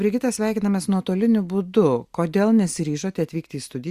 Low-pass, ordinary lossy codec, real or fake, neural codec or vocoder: 14.4 kHz; Opus, 64 kbps; real; none